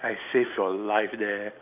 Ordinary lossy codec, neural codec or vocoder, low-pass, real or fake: none; none; 3.6 kHz; real